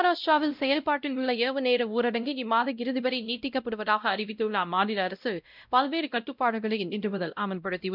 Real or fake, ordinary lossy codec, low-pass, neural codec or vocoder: fake; none; 5.4 kHz; codec, 16 kHz, 0.5 kbps, X-Codec, WavLM features, trained on Multilingual LibriSpeech